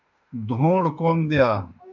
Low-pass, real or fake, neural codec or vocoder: 7.2 kHz; fake; autoencoder, 48 kHz, 32 numbers a frame, DAC-VAE, trained on Japanese speech